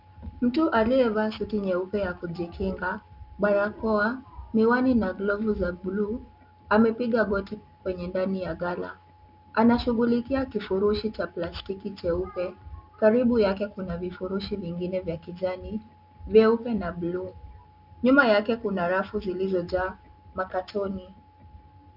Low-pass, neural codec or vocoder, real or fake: 5.4 kHz; none; real